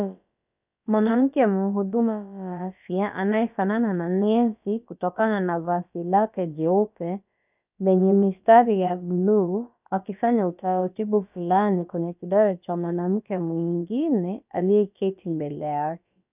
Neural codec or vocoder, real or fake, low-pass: codec, 16 kHz, about 1 kbps, DyCAST, with the encoder's durations; fake; 3.6 kHz